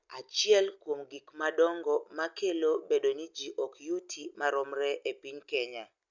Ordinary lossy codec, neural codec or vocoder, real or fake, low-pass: none; none; real; 7.2 kHz